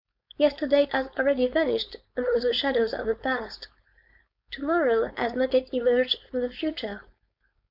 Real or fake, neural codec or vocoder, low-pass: fake; codec, 16 kHz, 4.8 kbps, FACodec; 5.4 kHz